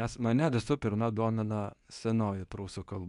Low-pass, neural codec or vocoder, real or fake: 10.8 kHz; codec, 24 kHz, 0.9 kbps, WavTokenizer, medium speech release version 2; fake